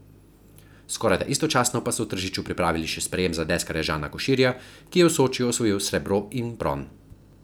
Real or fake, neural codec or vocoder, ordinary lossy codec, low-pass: real; none; none; none